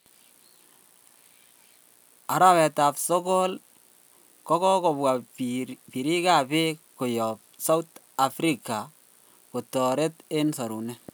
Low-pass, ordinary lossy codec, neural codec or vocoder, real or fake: none; none; none; real